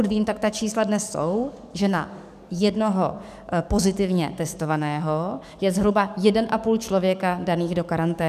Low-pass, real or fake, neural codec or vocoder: 14.4 kHz; fake; codec, 44.1 kHz, 7.8 kbps, DAC